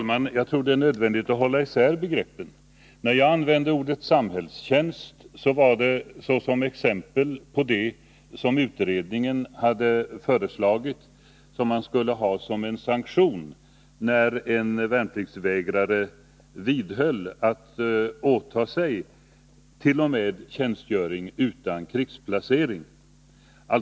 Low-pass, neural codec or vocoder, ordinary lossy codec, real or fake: none; none; none; real